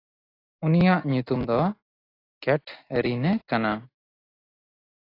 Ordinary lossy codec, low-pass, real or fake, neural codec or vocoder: AAC, 24 kbps; 5.4 kHz; real; none